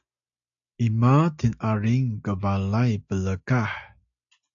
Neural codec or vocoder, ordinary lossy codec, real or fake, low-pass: none; AAC, 64 kbps; real; 7.2 kHz